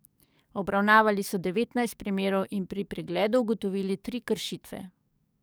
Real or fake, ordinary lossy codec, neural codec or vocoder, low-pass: fake; none; codec, 44.1 kHz, 7.8 kbps, DAC; none